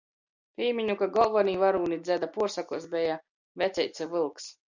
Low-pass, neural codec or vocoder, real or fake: 7.2 kHz; none; real